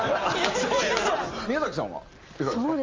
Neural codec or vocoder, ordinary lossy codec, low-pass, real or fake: none; Opus, 32 kbps; 7.2 kHz; real